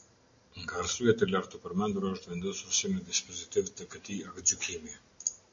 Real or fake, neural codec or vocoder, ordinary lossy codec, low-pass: real; none; AAC, 64 kbps; 7.2 kHz